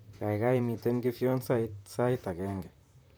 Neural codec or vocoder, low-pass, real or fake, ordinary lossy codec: vocoder, 44.1 kHz, 128 mel bands, Pupu-Vocoder; none; fake; none